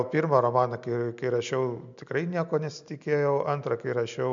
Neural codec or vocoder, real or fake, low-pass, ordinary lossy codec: none; real; 7.2 kHz; MP3, 64 kbps